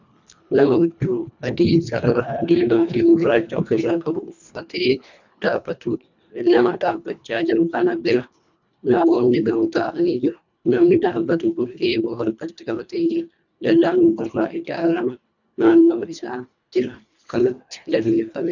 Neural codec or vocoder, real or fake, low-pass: codec, 24 kHz, 1.5 kbps, HILCodec; fake; 7.2 kHz